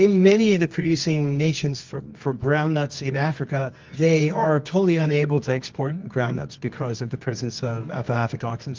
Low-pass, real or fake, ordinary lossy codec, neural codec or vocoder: 7.2 kHz; fake; Opus, 32 kbps; codec, 24 kHz, 0.9 kbps, WavTokenizer, medium music audio release